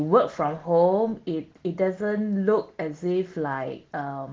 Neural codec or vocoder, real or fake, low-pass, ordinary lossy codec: none; real; 7.2 kHz; Opus, 16 kbps